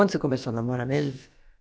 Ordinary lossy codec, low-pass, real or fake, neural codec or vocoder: none; none; fake; codec, 16 kHz, about 1 kbps, DyCAST, with the encoder's durations